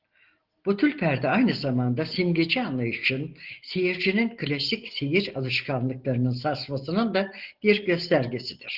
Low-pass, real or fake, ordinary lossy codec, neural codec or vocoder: 5.4 kHz; real; Opus, 16 kbps; none